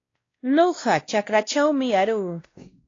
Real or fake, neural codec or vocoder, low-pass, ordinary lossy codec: fake; codec, 16 kHz, 1 kbps, X-Codec, WavLM features, trained on Multilingual LibriSpeech; 7.2 kHz; AAC, 32 kbps